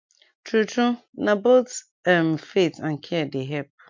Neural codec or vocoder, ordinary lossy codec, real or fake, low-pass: none; MP3, 48 kbps; real; 7.2 kHz